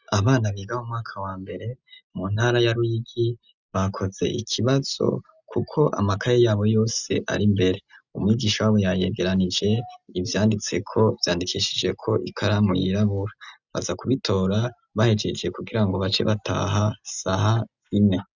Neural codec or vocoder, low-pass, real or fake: none; 7.2 kHz; real